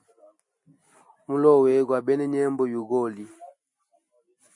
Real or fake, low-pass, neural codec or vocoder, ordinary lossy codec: real; 10.8 kHz; none; MP3, 48 kbps